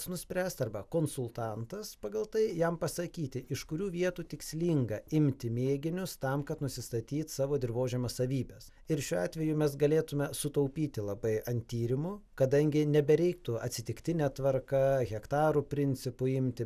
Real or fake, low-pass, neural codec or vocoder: real; 14.4 kHz; none